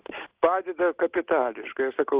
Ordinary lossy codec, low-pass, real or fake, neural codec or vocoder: Opus, 32 kbps; 3.6 kHz; real; none